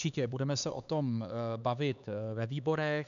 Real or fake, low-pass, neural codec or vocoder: fake; 7.2 kHz; codec, 16 kHz, 4 kbps, X-Codec, HuBERT features, trained on LibriSpeech